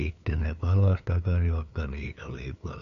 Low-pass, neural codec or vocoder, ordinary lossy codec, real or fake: 7.2 kHz; codec, 16 kHz, 2 kbps, FunCodec, trained on LibriTTS, 25 frames a second; none; fake